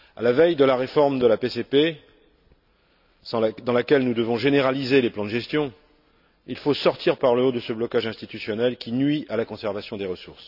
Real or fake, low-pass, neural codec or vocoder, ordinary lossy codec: real; 5.4 kHz; none; none